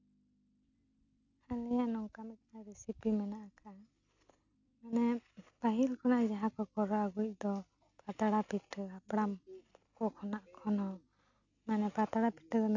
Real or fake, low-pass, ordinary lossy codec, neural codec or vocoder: real; 7.2 kHz; none; none